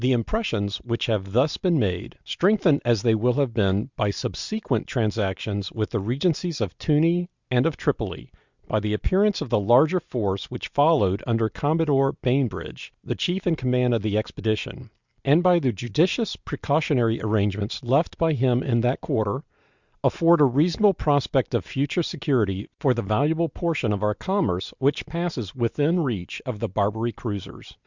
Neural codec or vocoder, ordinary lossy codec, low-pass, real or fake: none; Opus, 64 kbps; 7.2 kHz; real